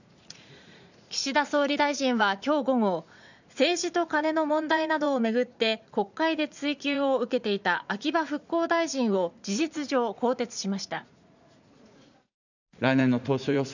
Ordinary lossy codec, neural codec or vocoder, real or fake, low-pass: none; vocoder, 44.1 kHz, 80 mel bands, Vocos; fake; 7.2 kHz